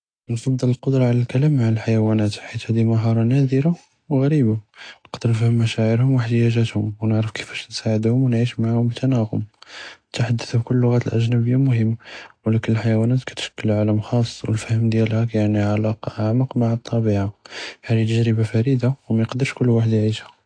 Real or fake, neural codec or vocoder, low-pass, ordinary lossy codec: real; none; 9.9 kHz; AAC, 48 kbps